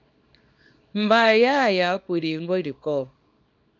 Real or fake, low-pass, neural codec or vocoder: fake; 7.2 kHz; codec, 24 kHz, 0.9 kbps, WavTokenizer, small release